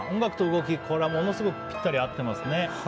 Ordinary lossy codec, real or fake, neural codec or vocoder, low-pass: none; real; none; none